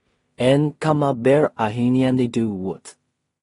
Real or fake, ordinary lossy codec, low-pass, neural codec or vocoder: fake; AAC, 32 kbps; 10.8 kHz; codec, 16 kHz in and 24 kHz out, 0.4 kbps, LongCat-Audio-Codec, two codebook decoder